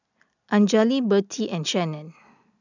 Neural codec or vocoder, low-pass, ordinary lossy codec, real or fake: none; 7.2 kHz; none; real